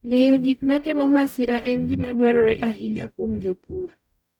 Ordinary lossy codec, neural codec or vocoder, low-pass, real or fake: none; codec, 44.1 kHz, 0.9 kbps, DAC; 19.8 kHz; fake